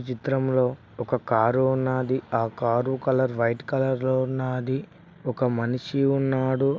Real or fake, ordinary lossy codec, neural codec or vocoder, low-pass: real; none; none; none